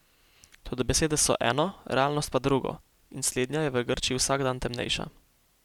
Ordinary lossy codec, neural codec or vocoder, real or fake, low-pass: none; none; real; 19.8 kHz